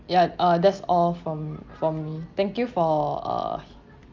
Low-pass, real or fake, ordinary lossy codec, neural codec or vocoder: 7.2 kHz; real; Opus, 24 kbps; none